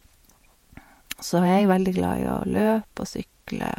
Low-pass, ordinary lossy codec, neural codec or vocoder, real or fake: 19.8 kHz; MP3, 64 kbps; vocoder, 48 kHz, 128 mel bands, Vocos; fake